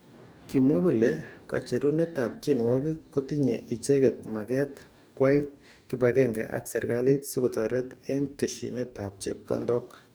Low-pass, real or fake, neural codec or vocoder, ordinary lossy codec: none; fake; codec, 44.1 kHz, 2.6 kbps, DAC; none